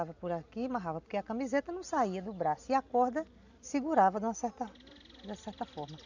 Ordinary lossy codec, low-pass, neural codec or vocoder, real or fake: none; 7.2 kHz; none; real